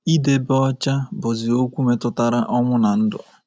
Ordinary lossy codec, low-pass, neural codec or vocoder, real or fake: none; none; none; real